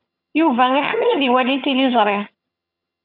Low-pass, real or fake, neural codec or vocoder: 5.4 kHz; fake; vocoder, 22.05 kHz, 80 mel bands, HiFi-GAN